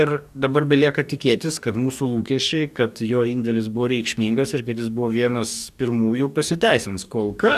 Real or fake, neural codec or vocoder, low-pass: fake; codec, 44.1 kHz, 2.6 kbps, DAC; 14.4 kHz